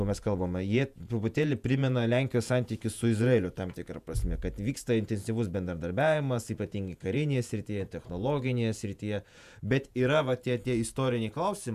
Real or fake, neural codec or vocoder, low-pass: fake; vocoder, 48 kHz, 128 mel bands, Vocos; 14.4 kHz